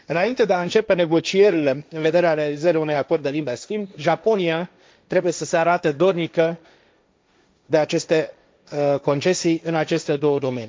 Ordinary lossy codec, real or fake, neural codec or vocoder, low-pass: none; fake; codec, 16 kHz, 1.1 kbps, Voila-Tokenizer; none